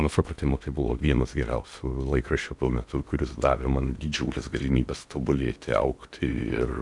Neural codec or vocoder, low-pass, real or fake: codec, 16 kHz in and 24 kHz out, 0.8 kbps, FocalCodec, streaming, 65536 codes; 10.8 kHz; fake